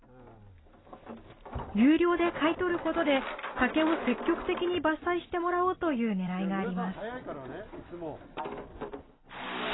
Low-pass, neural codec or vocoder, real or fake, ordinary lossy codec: 7.2 kHz; none; real; AAC, 16 kbps